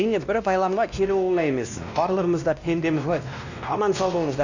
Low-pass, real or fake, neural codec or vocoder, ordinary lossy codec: 7.2 kHz; fake; codec, 16 kHz, 1 kbps, X-Codec, WavLM features, trained on Multilingual LibriSpeech; none